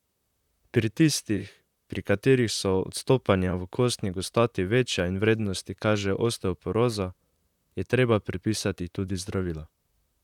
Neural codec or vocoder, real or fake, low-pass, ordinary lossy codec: vocoder, 44.1 kHz, 128 mel bands, Pupu-Vocoder; fake; 19.8 kHz; none